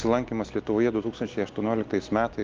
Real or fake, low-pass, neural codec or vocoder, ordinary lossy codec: real; 7.2 kHz; none; Opus, 32 kbps